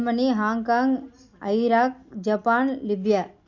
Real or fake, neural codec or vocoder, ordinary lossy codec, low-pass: real; none; none; 7.2 kHz